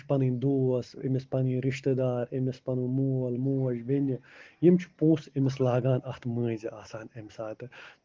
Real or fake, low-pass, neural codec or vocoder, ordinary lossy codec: real; 7.2 kHz; none; Opus, 16 kbps